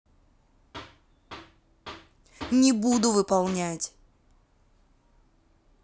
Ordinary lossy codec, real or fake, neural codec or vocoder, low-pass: none; real; none; none